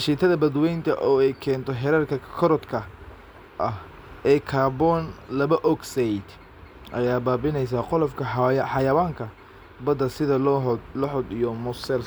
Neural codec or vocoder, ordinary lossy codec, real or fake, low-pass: none; none; real; none